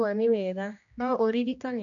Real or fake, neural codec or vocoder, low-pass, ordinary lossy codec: fake; codec, 16 kHz, 1 kbps, X-Codec, HuBERT features, trained on general audio; 7.2 kHz; none